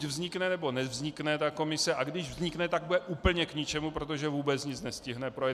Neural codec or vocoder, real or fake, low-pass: none; real; 14.4 kHz